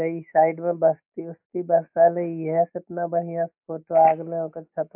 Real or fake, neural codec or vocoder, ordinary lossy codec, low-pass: real; none; MP3, 32 kbps; 3.6 kHz